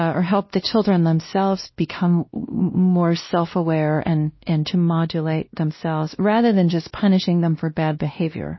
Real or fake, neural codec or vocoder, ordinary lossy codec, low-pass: fake; codec, 16 kHz, 1 kbps, X-Codec, WavLM features, trained on Multilingual LibriSpeech; MP3, 24 kbps; 7.2 kHz